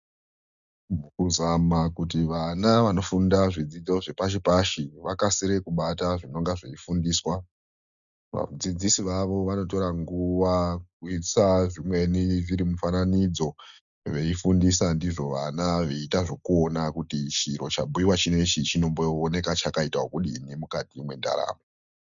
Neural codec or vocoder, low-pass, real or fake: none; 7.2 kHz; real